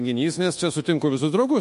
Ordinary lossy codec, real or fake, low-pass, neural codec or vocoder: MP3, 48 kbps; fake; 10.8 kHz; codec, 24 kHz, 1.2 kbps, DualCodec